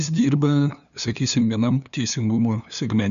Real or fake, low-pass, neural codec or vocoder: fake; 7.2 kHz; codec, 16 kHz, 2 kbps, FunCodec, trained on LibriTTS, 25 frames a second